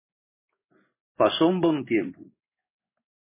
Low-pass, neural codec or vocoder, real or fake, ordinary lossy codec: 3.6 kHz; codec, 16 kHz in and 24 kHz out, 1 kbps, XY-Tokenizer; fake; MP3, 16 kbps